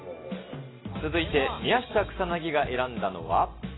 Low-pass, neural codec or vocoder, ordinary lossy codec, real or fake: 7.2 kHz; none; AAC, 16 kbps; real